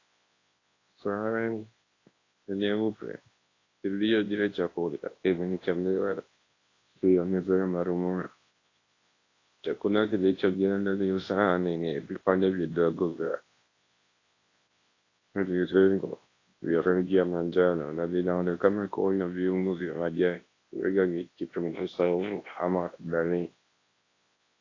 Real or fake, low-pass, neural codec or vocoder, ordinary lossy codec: fake; 7.2 kHz; codec, 24 kHz, 0.9 kbps, WavTokenizer, large speech release; AAC, 32 kbps